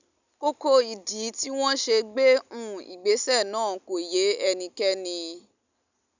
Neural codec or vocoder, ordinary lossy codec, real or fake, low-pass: none; none; real; 7.2 kHz